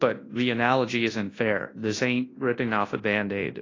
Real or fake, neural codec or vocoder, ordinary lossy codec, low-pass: fake; codec, 24 kHz, 0.9 kbps, WavTokenizer, large speech release; AAC, 32 kbps; 7.2 kHz